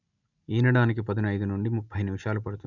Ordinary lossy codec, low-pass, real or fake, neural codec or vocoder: none; 7.2 kHz; real; none